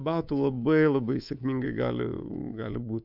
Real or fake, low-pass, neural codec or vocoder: fake; 5.4 kHz; codec, 24 kHz, 3.1 kbps, DualCodec